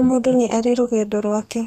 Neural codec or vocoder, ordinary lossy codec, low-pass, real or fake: codec, 32 kHz, 1.9 kbps, SNAC; none; 14.4 kHz; fake